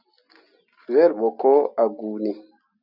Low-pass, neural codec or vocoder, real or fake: 5.4 kHz; none; real